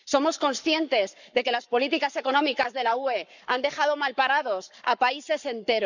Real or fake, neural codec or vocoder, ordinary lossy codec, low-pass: fake; codec, 44.1 kHz, 7.8 kbps, Pupu-Codec; none; 7.2 kHz